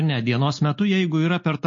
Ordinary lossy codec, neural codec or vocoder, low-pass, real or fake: MP3, 32 kbps; none; 7.2 kHz; real